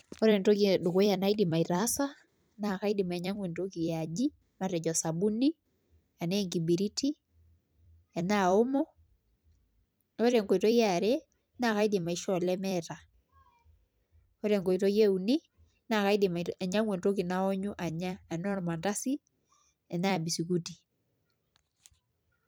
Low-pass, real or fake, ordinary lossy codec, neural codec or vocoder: none; fake; none; vocoder, 44.1 kHz, 128 mel bands every 256 samples, BigVGAN v2